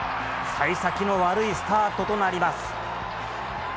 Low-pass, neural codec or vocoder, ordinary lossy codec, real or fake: none; none; none; real